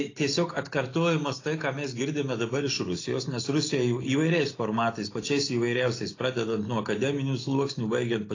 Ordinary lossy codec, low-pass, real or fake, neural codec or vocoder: AAC, 32 kbps; 7.2 kHz; real; none